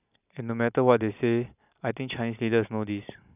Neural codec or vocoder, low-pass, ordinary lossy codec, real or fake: none; 3.6 kHz; none; real